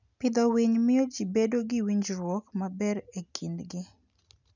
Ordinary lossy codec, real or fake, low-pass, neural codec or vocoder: none; real; 7.2 kHz; none